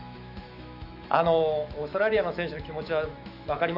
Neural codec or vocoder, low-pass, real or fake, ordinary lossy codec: autoencoder, 48 kHz, 128 numbers a frame, DAC-VAE, trained on Japanese speech; 5.4 kHz; fake; none